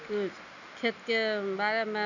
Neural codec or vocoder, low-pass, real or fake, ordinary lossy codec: none; 7.2 kHz; real; none